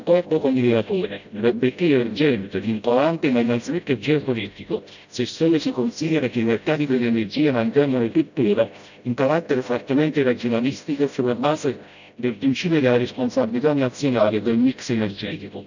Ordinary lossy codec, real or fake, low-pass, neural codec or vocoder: none; fake; 7.2 kHz; codec, 16 kHz, 0.5 kbps, FreqCodec, smaller model